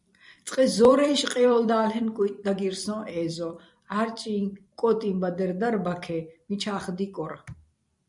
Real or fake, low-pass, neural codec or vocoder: fake; 10.8 kHz; vocoder, 44.1 kHz, 128 mel bands every 256 samples, BigVGAN v2